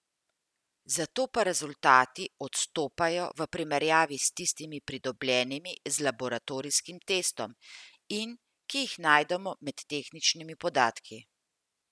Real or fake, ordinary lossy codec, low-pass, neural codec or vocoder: real; none; none; none